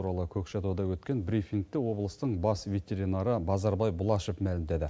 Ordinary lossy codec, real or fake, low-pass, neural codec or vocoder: none; real; none; none